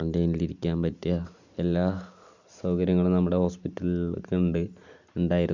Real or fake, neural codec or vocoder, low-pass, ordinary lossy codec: real; none; 7.2 kHz; none